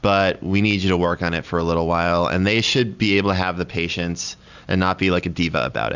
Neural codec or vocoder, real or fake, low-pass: none; real; 7.2 kHz